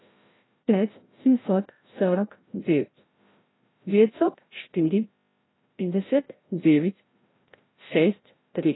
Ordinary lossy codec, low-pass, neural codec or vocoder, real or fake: AAC, 16 kbps; 7.2 kHz; codec, 16 kHz, 0.5 kbps, FreqCodec, larger model; fake